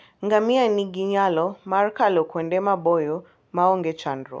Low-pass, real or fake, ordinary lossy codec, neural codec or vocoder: none; real; none; none